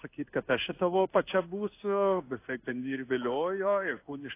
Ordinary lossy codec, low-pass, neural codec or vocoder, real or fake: AAC, 24 kbps; 3.6 kHz; codec, 16 kHz in and 24 kHz out, 1 kbps, XY-Tokenizer; fake